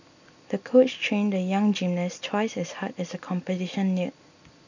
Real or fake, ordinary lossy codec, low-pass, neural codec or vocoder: real; none; 7.2 kHz; none